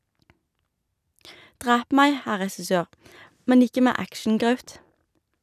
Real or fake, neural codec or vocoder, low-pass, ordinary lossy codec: real; none; 14.4 kHz; none